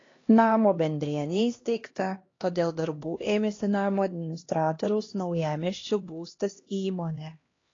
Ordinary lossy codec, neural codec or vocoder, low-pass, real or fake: AAC, 32 kbps; codec, 16 kHz, 1 kbps, X-Codec, HuBERT features, trained on LibriSpeech; 7.2 kHz; fake